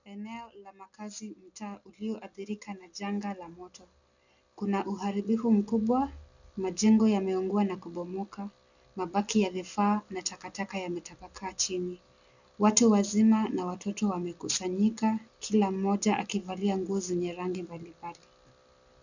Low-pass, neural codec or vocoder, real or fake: 7.2 kHz; none; real